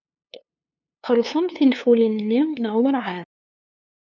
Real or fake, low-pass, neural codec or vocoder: fake; 7.2 kHz; codec, 16 kHz, 2 kbps, FunCodec, trained on LibriTTS, 25 frames a second